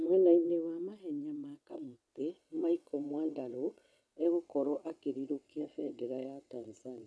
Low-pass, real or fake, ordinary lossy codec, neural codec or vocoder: none; fake; none; vocoder, 22.05 kHz, 80 mel bands, WaveNeXt